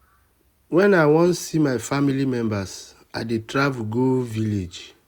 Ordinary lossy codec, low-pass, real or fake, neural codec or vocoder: none; 19.8 kHz; real; none